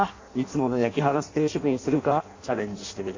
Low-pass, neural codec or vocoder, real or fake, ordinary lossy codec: 7.2 kHz; codec, 16 kHz in and 24 kHz out, 0.6 kbps, FireRedTTS-2 codec; fake; AAC, 48 kbps